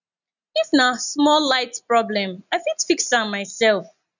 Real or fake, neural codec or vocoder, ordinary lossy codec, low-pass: real; none; none; 7.2 kHz